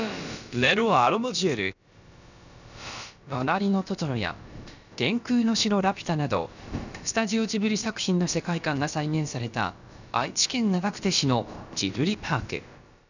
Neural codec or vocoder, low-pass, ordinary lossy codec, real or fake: codec, 16 kHz, about 1 kbps, DyCAST, with the encoder's durations; 7.2 kHz; none; fake